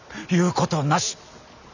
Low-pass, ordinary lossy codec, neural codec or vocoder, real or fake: 7.2 kHz; none; none; real